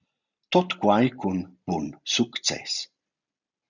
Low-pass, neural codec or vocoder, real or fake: 7.2 kHz; none; real